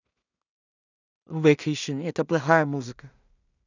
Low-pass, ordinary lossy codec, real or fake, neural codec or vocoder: 7.2 kHz; none; fake; codec, 16 kHz in and 24 kHz out, 0.4 kbps, LongCat-Audio-Codec, two codebook decoder